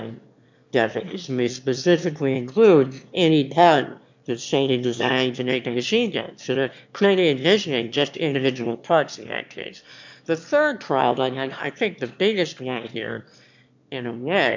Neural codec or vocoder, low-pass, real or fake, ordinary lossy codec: autoencoder, 22.05 kHz, a latent of 192 numbers a frame, VITS, trained on one speaker; 7.2 kHz; fake; MP3, 64 kbps